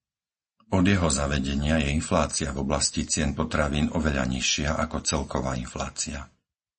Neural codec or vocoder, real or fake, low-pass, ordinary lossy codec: none; real; 10.8 kHz; MP3, 32 kbps